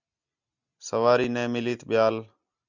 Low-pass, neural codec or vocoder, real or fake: 7.2 kHz; none; real